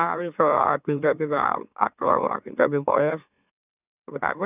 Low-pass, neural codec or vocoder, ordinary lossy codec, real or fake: 3.6 kHz; autoencoder, 44.1 kHz, a latent of 192 numbers a frame, MeloTTS; none; fake